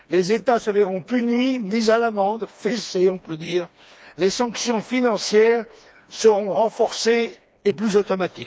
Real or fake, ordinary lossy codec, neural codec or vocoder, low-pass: fake; none; codec, 16 kHz, 2 kbps, FreqCodec, smaller model; none